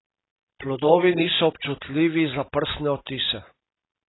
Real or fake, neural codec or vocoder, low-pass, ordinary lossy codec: real; none; 7.2 kHz; AAC, 16 kbps